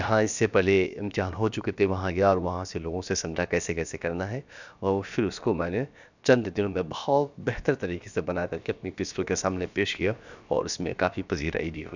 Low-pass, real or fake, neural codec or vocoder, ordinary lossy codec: 7.2 kHz; fake; codec, 16 kHz, about 1 kbps, DyCAST, with the encoder's durations; none